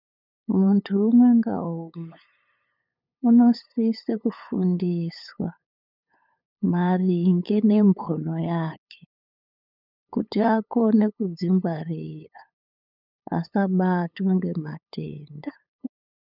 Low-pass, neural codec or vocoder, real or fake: 5.4 kHz; codec, 16 kHz, 8 kbps, FunCodec, trained on LibriTTS, 25 frames a second; fake